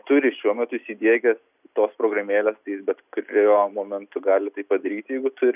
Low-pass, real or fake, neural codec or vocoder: 3.6 kHz; real; none